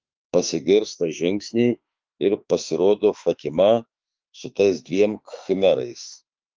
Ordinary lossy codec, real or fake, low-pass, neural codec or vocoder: Opus, 24 kbps; fake; 7.2 kHz; autoencoder, 48 kHz, 32 numbers a frame, DAC-VAE, trained on Japanese speech